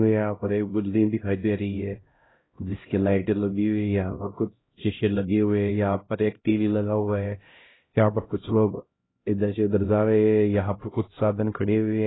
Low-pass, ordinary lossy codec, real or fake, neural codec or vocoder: 7.2 kHz; AAC, 16 kbps; fake; codec, 16 kHz, 0.5 kbps, X-Codec, HuBERT features, trained on LibriSpeech